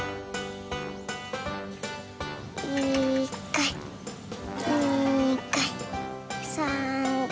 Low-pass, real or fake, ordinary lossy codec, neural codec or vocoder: none; real; none; none